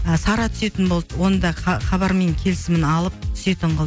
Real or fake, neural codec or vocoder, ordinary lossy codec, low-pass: real; none; none; none